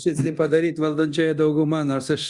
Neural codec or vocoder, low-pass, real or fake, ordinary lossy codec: codec, 24 kHz, 0.9 kbps, DualCodec; 10.8 kHz; fake; Opus, 64 kbps